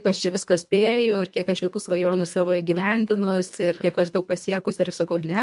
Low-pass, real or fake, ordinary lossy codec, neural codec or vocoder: 10.8 kHz; fake; MP3, 64 kbps; codec, 24 kHz, 1.5 kbps, HILCodec